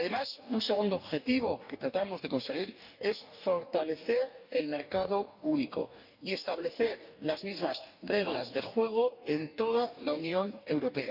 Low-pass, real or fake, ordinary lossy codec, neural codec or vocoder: 5.4 kHz; fake; none; codec, 44.1 kHz, 2.6 kbps, DAC